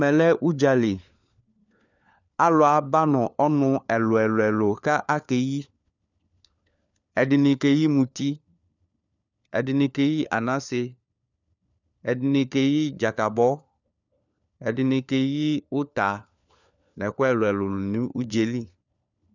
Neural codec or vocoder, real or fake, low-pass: codec, 16 kHz, 4 kbps, FunCodec, trained on LibriTTS, 50 frames a second; fake; 7.2 kHz